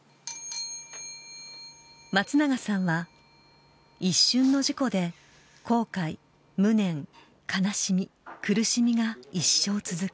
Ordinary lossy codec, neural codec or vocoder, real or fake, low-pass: none; none; real; none